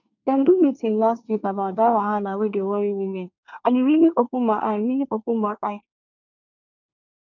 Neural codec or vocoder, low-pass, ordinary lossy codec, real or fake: codec, 24 kHz, 1 kbps, SNAC; 7.2 kHz; none; fake